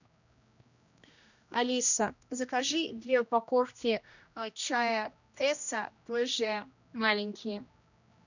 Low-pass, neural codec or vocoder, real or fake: 7.2 kHz; codec, 16 kHz, 1 kbps, X-Codec, HuBERT features, trained on general audio; fake